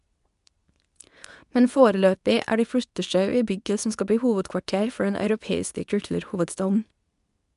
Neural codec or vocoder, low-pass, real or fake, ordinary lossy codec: codec, 24 kHz, 0.9 kbps, WavTokenizer, medium speech release version 2; 10.8 kHz; fake; none